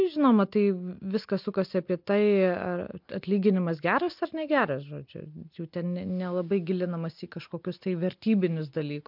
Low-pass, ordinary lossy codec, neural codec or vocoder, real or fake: 5.4 kHz; MP3, 48 kbps; none; real